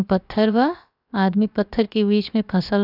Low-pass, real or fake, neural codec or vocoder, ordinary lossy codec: 5.4 kHz; fake; codec, 16 kHz, about 1 kbps, DyCAST, with the encoder's durations; none